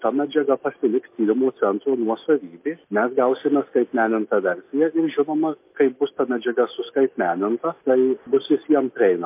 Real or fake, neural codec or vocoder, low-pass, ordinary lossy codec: real; none; 3.6 kHz; MP3, 24 kbps